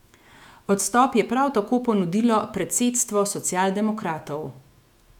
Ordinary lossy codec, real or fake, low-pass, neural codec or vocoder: none; fake; 19.8 kHz; autoencoder, 48 kHz, 128 numbers a frame, DAC-VAE, trained on Japanese speech